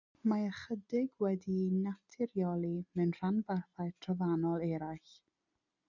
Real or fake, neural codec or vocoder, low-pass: real; none; 7.2 kHz